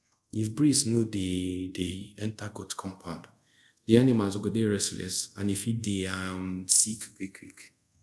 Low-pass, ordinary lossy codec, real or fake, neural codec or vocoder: none; none; fake; codec, 24 kHz, 0.5 kbps, DualCodec